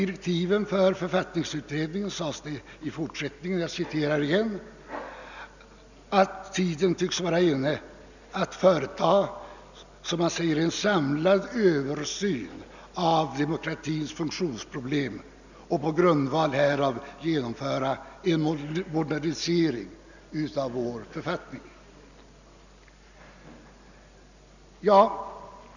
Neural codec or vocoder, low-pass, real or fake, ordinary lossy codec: none; 7.2 kHz; real; none